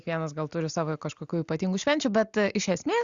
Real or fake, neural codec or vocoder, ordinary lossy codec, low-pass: real; none; Opus, 64 kbps; 7.2 kHz